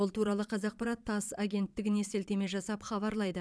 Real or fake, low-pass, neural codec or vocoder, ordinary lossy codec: fake; none; vocoder, 22.05 kHz, 80 mel bands, Vocos; none